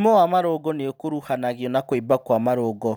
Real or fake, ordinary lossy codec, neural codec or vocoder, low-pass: real; none; none; none